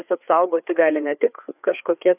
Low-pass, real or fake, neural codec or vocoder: 3.6 kHz; fake; codec, 16 kHz, 4 kbps, FreqCodec, larger model